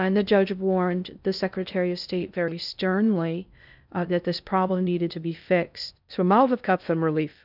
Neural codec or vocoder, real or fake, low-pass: codec, 16 kHz in and 24 kHz out, 0.6 kbps, FocalCodec, streaming, 2048 codes; fake; 5.4 kHz